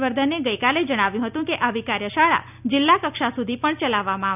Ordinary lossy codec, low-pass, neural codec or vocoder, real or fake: none; 3.6 kHz; none; real